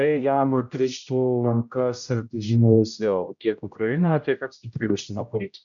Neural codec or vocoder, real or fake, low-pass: codec, 16 kHz, 0.5 kbps, X-Codec, HuBERT features, trained on general audio; fake; 7.2 kHz